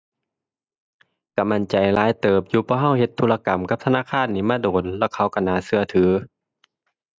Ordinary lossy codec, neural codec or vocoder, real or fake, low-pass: none; none; real; none